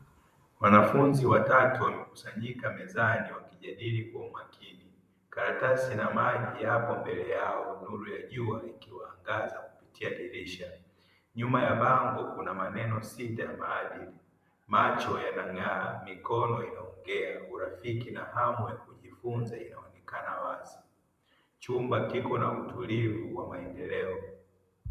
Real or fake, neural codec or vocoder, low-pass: fake; vocoder, 44.1 kHz, 128 mel bands, Pupu-Vocoder; 14.4 kHz